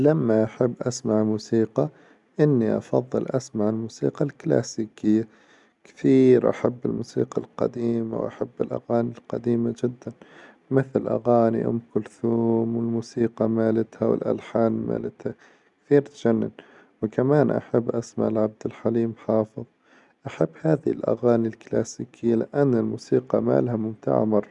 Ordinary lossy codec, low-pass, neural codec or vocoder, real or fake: none; 10.8 kHz; none; real